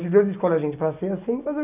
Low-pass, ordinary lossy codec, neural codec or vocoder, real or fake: 3.6 kHz; none; none; real